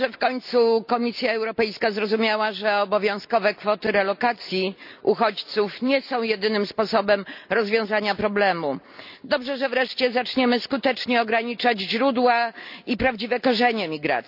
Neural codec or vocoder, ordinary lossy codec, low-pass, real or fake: none; none; 5.4 kHz; real